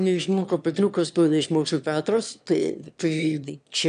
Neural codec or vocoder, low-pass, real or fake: autoencoder, 22.05 kHz, a latent of 192 numbers a frame, VITS, trained on one speaker; 9.9 kHz; fake